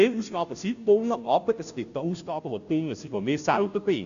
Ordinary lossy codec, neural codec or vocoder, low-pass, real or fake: AAC, 96 kbps; codec, 16 kHz, 0.5 kbps, FunCodec, trained on Chinese and English, 25 frames a second; 7.2 kHz; fake